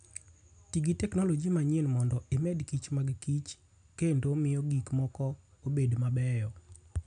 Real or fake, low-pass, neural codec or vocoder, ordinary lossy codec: real; 9.9 kHz; none; none